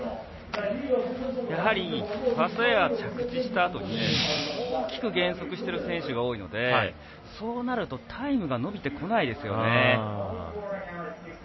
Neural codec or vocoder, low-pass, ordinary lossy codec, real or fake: none; 7.2 kHz; MP3, 24 kbps; real